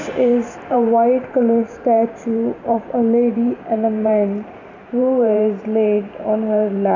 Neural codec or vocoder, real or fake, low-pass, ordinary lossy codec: vocoder, 44.1 kHz, 128 mel bands every 512 samples, BigVGAN v2; fake; 7.2 kHz; none